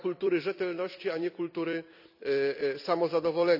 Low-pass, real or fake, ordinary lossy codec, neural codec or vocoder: 5.4 kHz; fake; none; vocoder, 44.1 kHz, 128 mel bands every 256 samples, BigVGAN v2